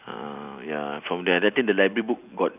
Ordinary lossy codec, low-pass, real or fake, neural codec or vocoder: none; 3.6 kHz; real; none